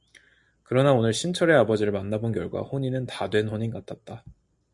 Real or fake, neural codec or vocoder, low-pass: real; none; 10.8 kHz